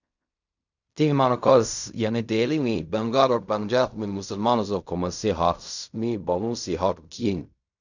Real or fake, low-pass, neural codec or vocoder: fake; 7.2 kHz; codec, 16 kHz in and 24 kHz out, 0.4 kbps, LongCat-Audio-Codec, fine tuned four codebook decoder